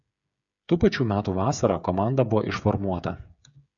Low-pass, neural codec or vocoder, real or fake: 7.2 kHz; codec, 16 kHz, 8 kbps, FreqCodec, smaller model; fake